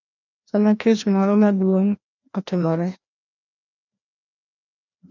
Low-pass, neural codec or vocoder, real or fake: 7.2 kHz; codec, 16 kHz, 1 kbps, FreqCodec, larger model; fake